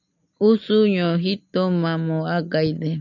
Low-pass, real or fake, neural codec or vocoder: 7.2 kHz; real; none